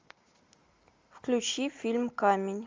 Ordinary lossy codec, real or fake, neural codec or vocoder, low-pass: Opus, 32 kbps; real; none; 7.2 kHz